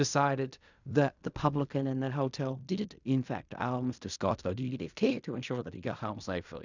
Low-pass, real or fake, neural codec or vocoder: 7.2 kHz; fake; codec, 16 kHz in and 24 kHz out, 0.4 kbps, LongCat-Audio-Codec, fine tuned four codebook decoder